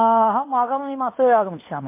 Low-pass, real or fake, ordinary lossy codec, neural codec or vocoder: 3.6 kHz; fake; MP3, 24 kbps; codec, 16 kHz in and 24 kHz out, 0.9 kbps, LongCat-Audio-Codec, fine tuned four codebook decoder